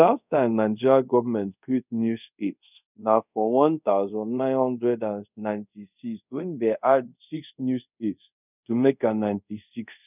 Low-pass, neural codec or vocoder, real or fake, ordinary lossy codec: 3.6 kHz; codec, 24 kHz, 0.5 kbps, DualCodec; fake; none